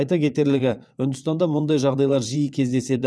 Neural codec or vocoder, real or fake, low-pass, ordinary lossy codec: vocoder, 22.05 kHz, 80 mel bands, WaveNeXt; fake; none; none